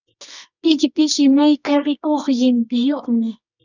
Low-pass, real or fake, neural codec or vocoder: 7.2 kHz; fake; codec, 24 kHz, 0.9 kbps, WavTokenizer, medium music audio release